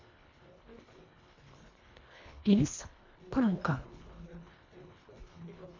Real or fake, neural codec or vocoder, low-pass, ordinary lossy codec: fake; codec, 24 kHz, 1.5 kbps, HILCodec; 7.2 kHz; MP3, 48 kbps